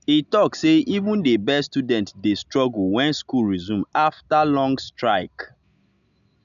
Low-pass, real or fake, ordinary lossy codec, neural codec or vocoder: 7.2 kHz; real; none; none